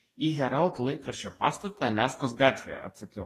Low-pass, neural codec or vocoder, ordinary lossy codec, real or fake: 14.4 kHz; codec, 44.1 kHz, 2.6 kbps, DAC; AAC, 48 kbps; fake